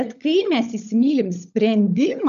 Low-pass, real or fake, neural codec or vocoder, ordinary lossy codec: 7.2 kHz; fake; codec, 16 kHz, 16 kbps, FunCodec, trained on LibriTTS, 50 frames a second; MP3, 96 kbps